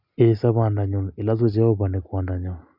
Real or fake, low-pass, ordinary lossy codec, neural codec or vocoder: real; 5.4 kHz; none; none